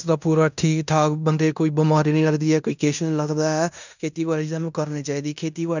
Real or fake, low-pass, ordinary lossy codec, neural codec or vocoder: fake; 7.2 kHz; none; codec, 16 kHz in and 24 kHz out, 0.9 kbps, LongCat-Audio-Codec, fine tuned four codebook decoder